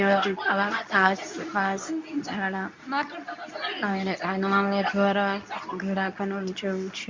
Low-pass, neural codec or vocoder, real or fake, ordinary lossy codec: 7.2 kHz; codec, 24 kHz, 0.9 kbps, WavTokenizer, medium speech release version 2; fake; MP3, 64 kbps